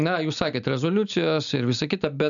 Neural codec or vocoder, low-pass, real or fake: none; 7.2 kHz; real